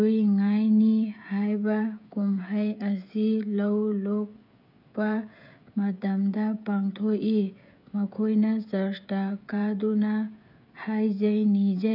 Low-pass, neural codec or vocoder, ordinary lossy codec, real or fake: 5.4 kHz; codec, 16 kHz, 16 kbps, FreqCodec, smaller model; none; fake